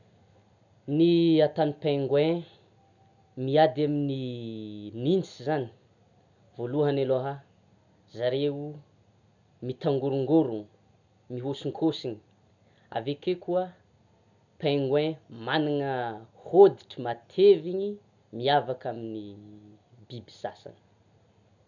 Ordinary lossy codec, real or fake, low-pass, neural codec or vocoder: none; real; 7.2 kHz; none